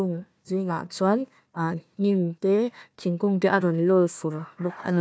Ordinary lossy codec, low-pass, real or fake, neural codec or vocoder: none; none; fake; codec, 16 kHz, 1 kbps, FunCodec, trained on Chinese and English, 50 frames a second